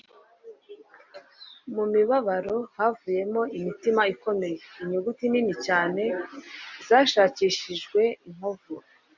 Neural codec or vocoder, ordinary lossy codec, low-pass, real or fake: none; MP3, 64 kbps; 7.2 kHz; real